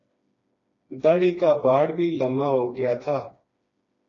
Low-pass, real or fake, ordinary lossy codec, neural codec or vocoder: 7.2 kHz; fake; AAC, 32 kbps; codec, 16 kHz, 2 kbps, FreqCodec, smaller model